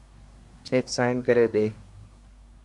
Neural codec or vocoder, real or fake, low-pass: codec, 24 kHz, 1 kbps, SNAC; fake; 10.8 kHz